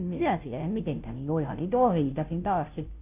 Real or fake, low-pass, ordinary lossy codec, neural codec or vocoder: fake; 3.6 kHz; none; codec, 16 kHz, 0.5 kbps, FunCodec, trained on Chinese and English, 25 frames a second